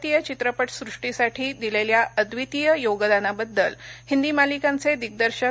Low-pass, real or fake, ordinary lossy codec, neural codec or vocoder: none; real; none; none